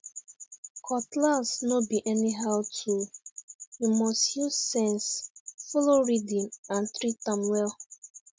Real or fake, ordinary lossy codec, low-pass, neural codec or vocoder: real; none; none; none